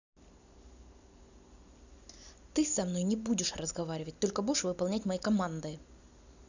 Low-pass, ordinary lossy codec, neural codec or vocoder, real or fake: 7.2 kHz; none; none; real